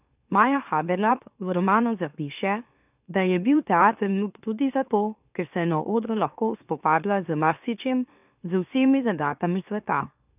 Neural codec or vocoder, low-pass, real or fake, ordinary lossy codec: autoencoder, 44.1 kHz, a latent of 192 numbers a frame, MeloTTS; 3.6 kHz; fake; none